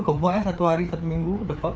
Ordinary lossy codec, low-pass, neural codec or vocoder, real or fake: none; none; codec, 16 kHz, 4 kbps, FreqCodec, larger model; fake